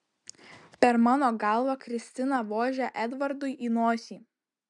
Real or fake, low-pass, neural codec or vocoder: real; 10.8 kHz; none